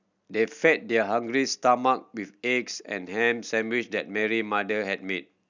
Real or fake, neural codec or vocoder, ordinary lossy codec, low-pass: real; none; none; 7.2 kHz